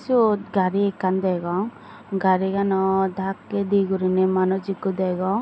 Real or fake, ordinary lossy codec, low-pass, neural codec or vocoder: real; none; none; none